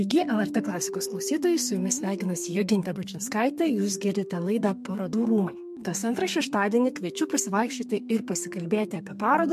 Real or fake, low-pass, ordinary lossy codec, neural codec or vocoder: fake; 14.4 kHz; MP3, 64 kbps; codec, 32 kHz, 1.9 kbps, SNAC